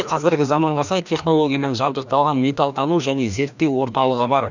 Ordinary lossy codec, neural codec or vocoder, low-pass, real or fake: none; codec, 16 kHz, 1 kbps, FreqCodec, larger model; 7.2 kHz; fake